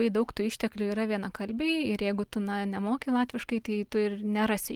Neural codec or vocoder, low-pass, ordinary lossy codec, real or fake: none; 19.8 kHz; Opus, 24 kbps; real